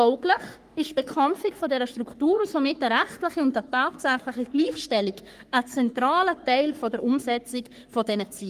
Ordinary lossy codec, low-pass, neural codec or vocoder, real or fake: Opus, 32 kbps; 14.4 kHz; codec, 44.1 kHz, 3.4 kbps, Pupu-Codec; fake